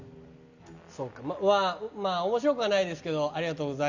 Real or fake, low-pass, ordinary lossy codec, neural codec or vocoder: real; 7.2 kHz; none; none